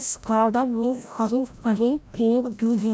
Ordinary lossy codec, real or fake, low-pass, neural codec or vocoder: none; fake; none; codec, 16 kHz, 0.5 kbps, FreqCodec, larger model